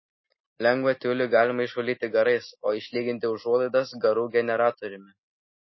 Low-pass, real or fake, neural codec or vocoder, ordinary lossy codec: 7.2 kHz; real; none; MP3, 24 kbps